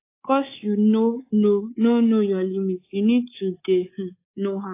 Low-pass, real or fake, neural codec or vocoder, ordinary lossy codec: 3.6 kHz; fake; codec, 24 kHz, 3.1 kbps, DualCodec; AAC, 24 kbps